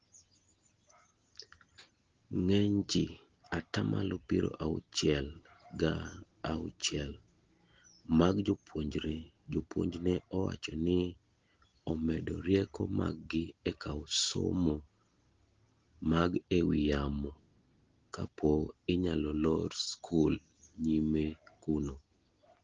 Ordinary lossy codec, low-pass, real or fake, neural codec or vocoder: Opus, 16 kbps; 7.2 kHz; real; none